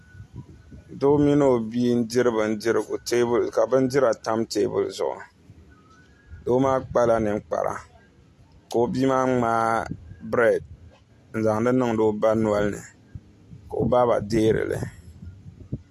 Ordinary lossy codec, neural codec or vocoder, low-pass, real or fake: AAC, 64 kbps; none; 14.4 kHz; real